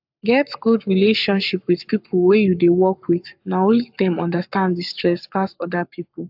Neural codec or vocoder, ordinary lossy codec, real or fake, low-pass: codec, 44.1 kHz, 7.8 kbps, Pupu-Codec; AAC, 48 kbps; fake; 5.4 kHz